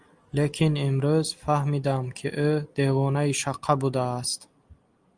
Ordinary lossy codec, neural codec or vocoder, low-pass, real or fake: Opus, 32 kbps; none; 9.9 kHz; real